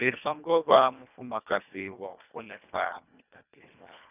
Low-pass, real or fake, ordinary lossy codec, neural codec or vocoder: 3.6 kHz; fake; none; codec, 24 kHz, 1.5 kbps, HILCodec